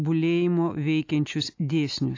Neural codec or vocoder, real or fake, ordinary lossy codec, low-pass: none; real; AAC, 48 kbps; 7.2 kHz